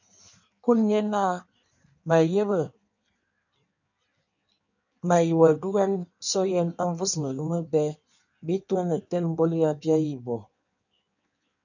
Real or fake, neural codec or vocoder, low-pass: fake; codec, 16 kHz in and 24 kHz out, 1.1 kbps, FireRedTTS-2 codec; 7.2 kHz